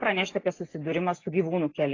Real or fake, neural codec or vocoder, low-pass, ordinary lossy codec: real; none; 7.2 kHz; AAC, 32 kbps